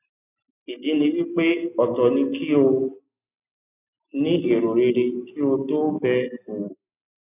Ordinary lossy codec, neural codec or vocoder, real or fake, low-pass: none; none; real; 3.6 kHz